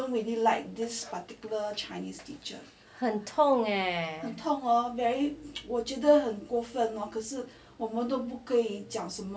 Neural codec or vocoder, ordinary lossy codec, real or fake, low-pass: none; none; real; none